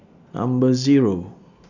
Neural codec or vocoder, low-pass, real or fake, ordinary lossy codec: none; 7.2 kHz; real; none